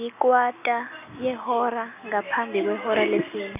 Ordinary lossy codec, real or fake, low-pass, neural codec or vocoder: none; real; 3.6 kHz; none